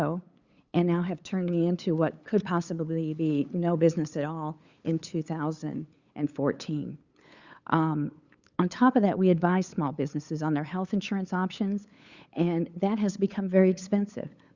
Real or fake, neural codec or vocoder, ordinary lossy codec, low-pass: fake; codec, 16 kHz, 8 kbps, FunCodec, trained on LibriTTS, 25 frames a second; Opus, 64 kbps; 7.2 kHz